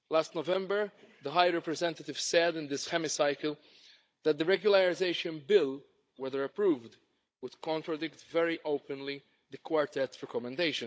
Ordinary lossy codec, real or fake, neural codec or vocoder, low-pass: none; fake; codec, 16 kHz, 16 kbps, FunCodec, trained on Chinese and English, 50 frames a second; none